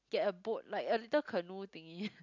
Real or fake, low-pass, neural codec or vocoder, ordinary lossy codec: real; 7.2 kHz; none; none